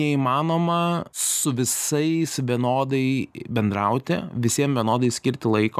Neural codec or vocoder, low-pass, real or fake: none; 14.4 kHz; real